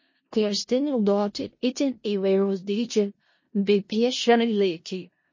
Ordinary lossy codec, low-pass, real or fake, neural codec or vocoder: MP3, 32 kbps; 7.2 kHz; fake; codec, 16 kHz in and 24 kHz out, 0.4 kbps, LongCat-Audio-Codec, four codebook decoder